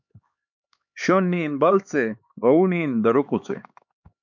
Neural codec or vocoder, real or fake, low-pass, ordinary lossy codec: codec, 16 kHz, 4 kbps, X-Codec, HuBERT features, trained on LibriSpeech; fake; 7.2 kHz; MP3, 64 kbps